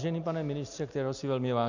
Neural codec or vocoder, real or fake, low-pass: none; real; 7.2 kHz